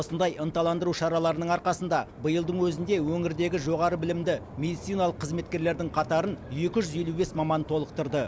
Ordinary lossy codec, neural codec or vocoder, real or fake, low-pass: none; none; real; none